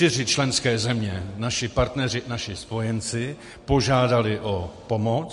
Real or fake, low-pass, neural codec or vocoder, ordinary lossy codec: real; 14.4 kHz; none; MP3, 48 kbps